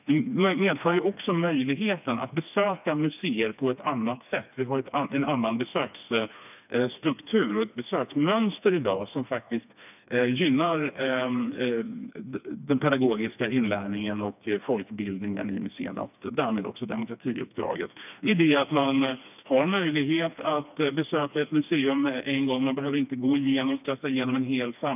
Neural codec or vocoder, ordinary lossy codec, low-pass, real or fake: codec, 16 kHz, 2 kbps, FreqCodec, smaller model; none; 3.6 kHz; fake